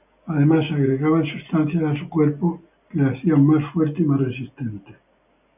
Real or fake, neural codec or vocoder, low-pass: real; none; 3.6 kHz